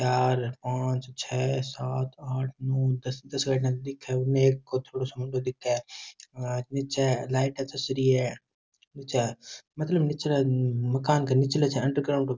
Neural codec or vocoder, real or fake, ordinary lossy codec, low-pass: none; real; none; none